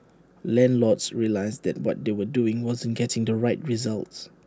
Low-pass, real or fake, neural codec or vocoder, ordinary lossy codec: none; real; none; none